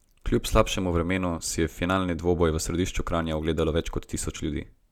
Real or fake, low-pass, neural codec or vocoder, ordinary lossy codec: real; 19.8 kHz; none; none